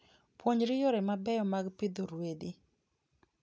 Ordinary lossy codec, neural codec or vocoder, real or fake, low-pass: none; none; real; none